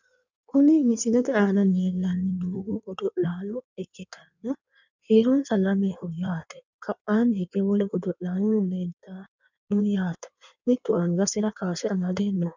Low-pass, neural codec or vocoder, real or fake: 7.2 kHz; codec, 16 kHz in and 24 kHz out, 1.1 kbps, FireRedTTS-2 codec; fake